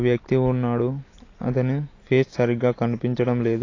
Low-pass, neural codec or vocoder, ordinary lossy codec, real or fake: 7.2 kHz; none; AAC, 32 kbps; real